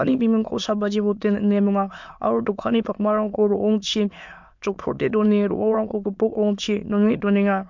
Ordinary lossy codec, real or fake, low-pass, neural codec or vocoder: MP3, 64 kbps; fake; 7.2 kHz; autoencoder, 22.05 kHz, a latent of 192 numbers a frame, VITS, trained on many speakers